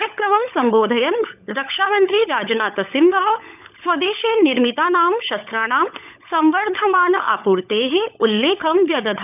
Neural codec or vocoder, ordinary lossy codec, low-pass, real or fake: codec, 16 kHz, 16 kbps, FunCodec, trained on LibriTTS, 50 frames a second; none; 3.6 kHz; fake